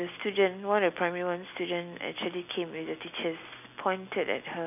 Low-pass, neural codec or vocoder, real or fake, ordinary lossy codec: 3.6 kHz; none; real; none